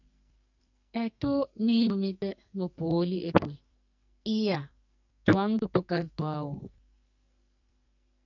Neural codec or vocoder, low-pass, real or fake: codec, 44.1 kHz, 2.6 kbps, SNAC; 7.2 kHz; fake